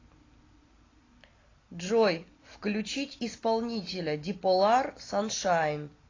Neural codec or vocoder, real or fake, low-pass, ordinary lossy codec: none; real; 7.2 kHz; AAC, 32 kbps